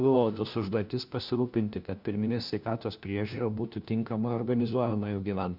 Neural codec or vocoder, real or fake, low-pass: codec, 16 kHz, 1 kbps, FunCodec, trained on LibriTTS, 50 frames a second; fake; 5.4 kHz